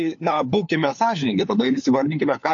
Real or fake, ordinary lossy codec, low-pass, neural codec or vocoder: fake; AAC, 48 kbps; 7.2 kHz; codec, 16 kHz, 4 kbps, FunCodec, trained on LibriTTS, 50 frames a second